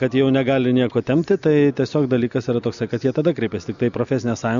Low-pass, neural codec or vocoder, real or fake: 7.2 kHz; none; real